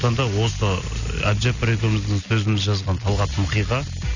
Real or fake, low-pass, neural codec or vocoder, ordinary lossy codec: real; 7.2 kHz; none; none